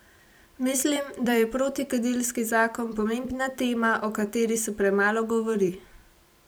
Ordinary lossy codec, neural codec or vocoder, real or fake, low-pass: none; vocoder, 44.1 kHz, 128 mel bands every 256 samples, BigVGAN v2; fake; none